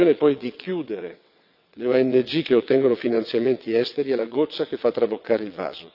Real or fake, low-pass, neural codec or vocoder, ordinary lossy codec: fake; 5.4 kHz; vocoder, 22.05 kHz, 80 mel bands, WaveNeXt; AAC, 48 kbps